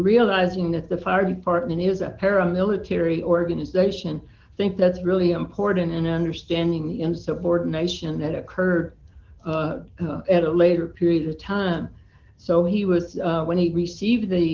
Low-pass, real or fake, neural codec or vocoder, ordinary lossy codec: 7.2 kHz; real; none; Opus, 16 kbps